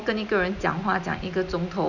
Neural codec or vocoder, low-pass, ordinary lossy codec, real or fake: none; 7.2 kHz; none; real